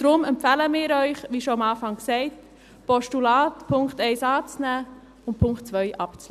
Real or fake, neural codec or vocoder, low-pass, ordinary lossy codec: real; none; 14.4 kHz; none